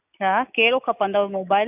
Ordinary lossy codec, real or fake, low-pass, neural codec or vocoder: none; real; 3.6 kHz; none